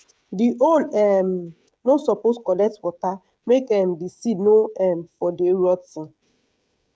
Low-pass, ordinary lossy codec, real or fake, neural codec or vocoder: none; none; fake; codec, 16 kHz, 16 kbps, FreqCodec, smaller model